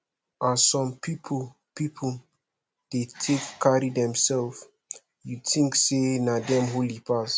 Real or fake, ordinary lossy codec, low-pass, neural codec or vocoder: real; none; none; none